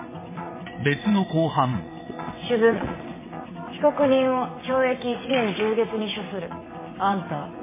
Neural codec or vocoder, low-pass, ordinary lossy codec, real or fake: vocoder, 22.05 kHz, 80 mel bands, WaveNeXt; 3.6 kHz; MP3, 16 kbps; fake